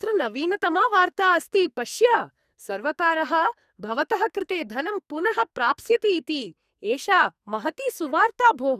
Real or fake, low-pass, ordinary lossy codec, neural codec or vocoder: fake; 14.4 kHz; none; codec, 44.1 kHz, 2.6 kbps, SNAC